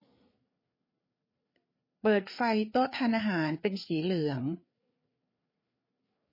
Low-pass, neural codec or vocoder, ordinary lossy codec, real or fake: 5.4 kHz; codec, 16 kHz, 4 kbps, FreqCodec, larger model; MP3, 24 kbps; fake